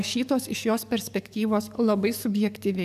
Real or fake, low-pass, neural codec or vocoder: fake; 14.4 kHz; codec, 44.1 kHz, 7.8 kbps, DAC